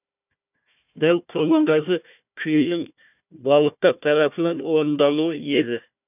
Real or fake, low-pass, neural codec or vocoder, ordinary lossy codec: fake; 3.6 kHz; codec, 16 kHz, 1 kbps, FunCodec, trained on Chinese and English, 50 frames a second; none